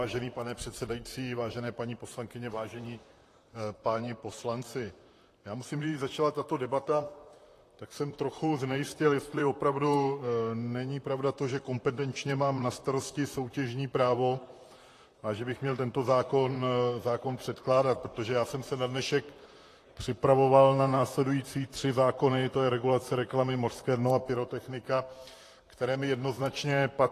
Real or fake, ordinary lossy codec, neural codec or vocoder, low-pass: fake; AAC, 48 kbps; vocoder, 44.1 kHz, 128 mel bands, Pupu-Vocoder; 14.4 kHz